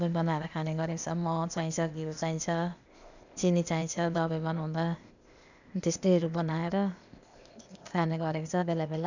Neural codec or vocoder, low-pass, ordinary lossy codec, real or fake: codec, 16 kHz, 0.8 kbps, ZipCodec; 7.2 kHz; none; fake